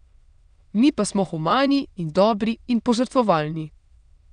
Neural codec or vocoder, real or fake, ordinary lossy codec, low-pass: autoencoder, 22.05 kHz, a latent of 192 numbers a frame, VITS, trained on many speakers; fake; none; 9.9 kHz